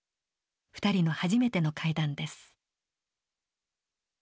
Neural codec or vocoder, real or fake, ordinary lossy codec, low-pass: none; real; none; none